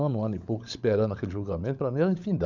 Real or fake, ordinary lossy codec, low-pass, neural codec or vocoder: fake; none; 7.2 kHz; codec, 16 kHz, 16 kbps, FunCodec, trained on LibriTTS, 50 frames a second